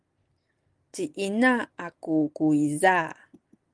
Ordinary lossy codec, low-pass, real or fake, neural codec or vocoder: Opus, 24 kbps; 9.9 kHz; real; none